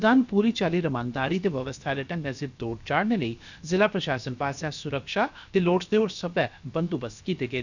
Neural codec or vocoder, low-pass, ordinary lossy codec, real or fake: codec, 16 kHz, about 1 kbps, DyCAST, with the encoder's durations; 7.2 kHz; none; fake